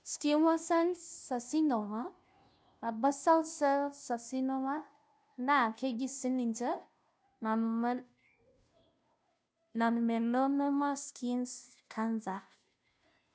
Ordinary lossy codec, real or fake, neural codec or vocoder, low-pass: none; fake; codec, 16 kHz, 0.5 kbps, FunCodec, trained on Chinese and English, 25 frames a second; none